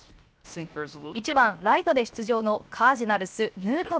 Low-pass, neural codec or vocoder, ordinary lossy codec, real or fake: none; codec, 16 kHz, 0.7 kbps, FocalCodec; none; fake